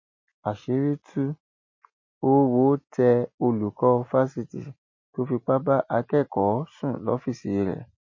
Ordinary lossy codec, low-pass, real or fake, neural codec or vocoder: MP3, 32 kbps; 7.2 kHz; real; none